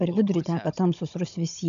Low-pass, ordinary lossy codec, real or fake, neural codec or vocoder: 7.2 kHz; AAC, 96 kbps; fake; codec, 16 kHz, 16 kbps, FunCodec, trained on Chinese and English, 50 frames a second